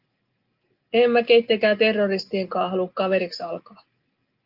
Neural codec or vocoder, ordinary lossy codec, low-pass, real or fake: none; Opus, 32 kbps; 5.4 kHz; real